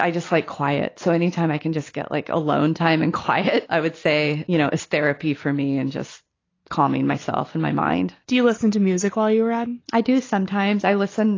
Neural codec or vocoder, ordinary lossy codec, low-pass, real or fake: none; AAC, 32 kbps; 7.2 kHz; real